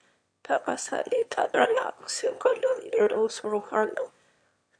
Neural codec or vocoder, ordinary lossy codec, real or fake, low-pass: autoencoder, 22.05 kHz, a latent of 192 numbers a frame, VITS, trained on one speaker; MP3, 64 kbps; fake; 9.9 kHz